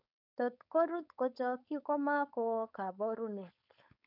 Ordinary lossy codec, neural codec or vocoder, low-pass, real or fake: none; codec, 16 kHz, 4.8 kbps, FACodec; 5.4 kHz; fake